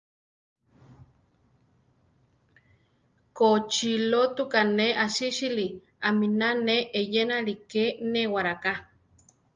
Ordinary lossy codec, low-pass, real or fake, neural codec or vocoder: Opus, 24 kbps; 7.2 kHz; real; none